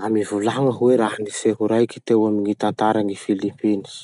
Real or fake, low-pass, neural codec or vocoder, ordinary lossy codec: real; 10.8 kHz; none; none